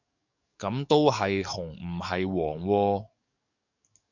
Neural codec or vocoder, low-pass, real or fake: codec, 44.1 kHz, 7.8 kbps, DAC; 7.2 kHz; fake